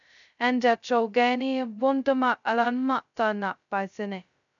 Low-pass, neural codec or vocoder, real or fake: 7.2 kHz; codec, 16 kHz, 0.2 kbps, FocalCodec; fake